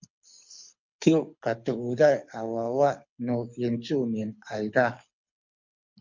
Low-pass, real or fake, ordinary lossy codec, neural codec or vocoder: 7.2 kHz; fake; MP3, 48 kbps; codec, 24 kHz, 6 kbps, HILCodec